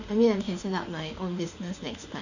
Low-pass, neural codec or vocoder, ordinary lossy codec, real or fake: 7.2 kHz; autoencoder, 48 kHz, 32 numbers a frame, DAC-VAE, trained on Japanese speech; none; fake